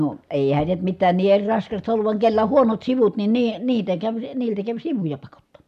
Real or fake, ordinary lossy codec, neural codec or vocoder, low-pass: real; MP3, 96 kbps; none; 14.4 kHz